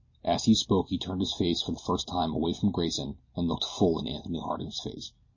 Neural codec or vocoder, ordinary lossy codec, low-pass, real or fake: none; MP3, 32 kbps; 7.2 kHz; real